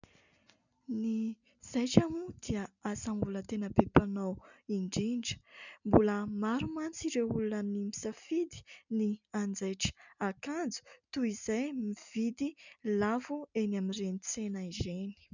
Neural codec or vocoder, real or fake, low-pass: none; real; 7.2 kHz